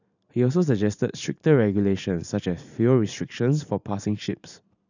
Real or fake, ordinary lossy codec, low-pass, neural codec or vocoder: fake; none; 7.2 kHz; vocoder, 44.1 kHz, 128 mel bands every 512 samples, BigVGAN v2